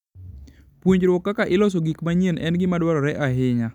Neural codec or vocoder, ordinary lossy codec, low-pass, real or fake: none; none; 19.8 kHz; real